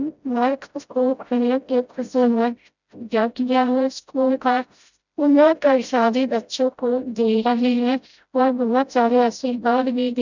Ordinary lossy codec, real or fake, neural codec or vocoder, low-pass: none; fake; codec, 16 kHz, 0.5 kbps, FreqCodec, smaller model; 7.2 kHz